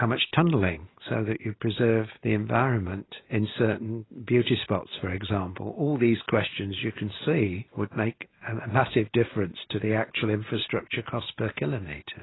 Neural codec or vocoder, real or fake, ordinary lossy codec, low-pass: none; real; AAC, 16 kbps; 7.2 kHz